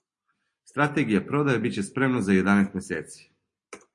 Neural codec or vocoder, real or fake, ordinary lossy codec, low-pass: none; real; MP3, 48 kbps; 10.8 kHz